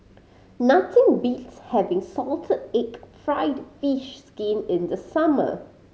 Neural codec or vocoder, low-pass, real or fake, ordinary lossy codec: none; none; real; none